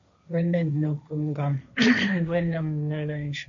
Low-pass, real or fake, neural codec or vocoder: 7.2 kHz; fake; codec, 16 kHz, 1.1 kbps, Voila-Tokenizer